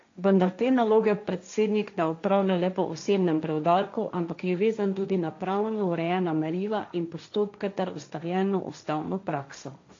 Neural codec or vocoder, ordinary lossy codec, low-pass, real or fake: codec, 16 kHz, 1.1 kbps, Voila-Tokenizer; AAC, 48 kbps; 7.2 kHz; fake